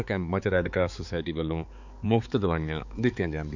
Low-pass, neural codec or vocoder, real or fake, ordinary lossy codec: 7.2 kHz; codec, 16 kHz, 4 kbps, X-Codec, HuBERT features, trained on balanced general audio; fake; none